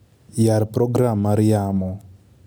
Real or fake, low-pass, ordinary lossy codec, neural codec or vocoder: real; none; none; none